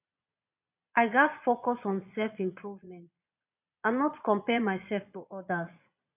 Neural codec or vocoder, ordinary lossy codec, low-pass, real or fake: vocoder, 22.05 kHz, 80 mel bands, Vocos; none; 3.6 kHz; fake